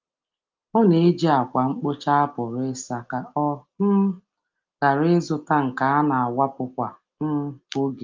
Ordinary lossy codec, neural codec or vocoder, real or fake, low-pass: Opus, 32 kbps; none; real; 7.2 kHz